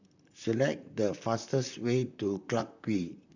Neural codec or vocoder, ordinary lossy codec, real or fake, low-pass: vocoder, 44.1 kHz, 128 mel bands, Pupu-Vocoder; none; fake; 7.2 kHz